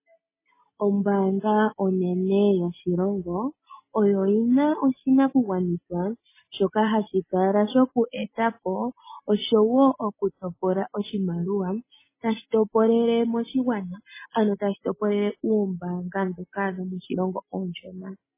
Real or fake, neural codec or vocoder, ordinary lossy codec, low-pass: real; none; MP3, 16 kbps; 3.6 kHz